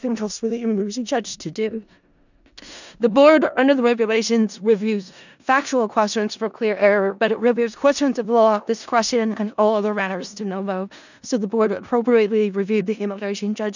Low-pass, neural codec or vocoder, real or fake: 7.2 kHz; codec, 16 kHz in and 24 kHz out, 0.4 kbps, LongCat-Audio-Codec, four codebook decoder; fake